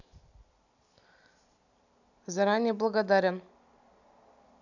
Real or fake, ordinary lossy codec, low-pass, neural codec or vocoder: real; none; 7.2 kHz; none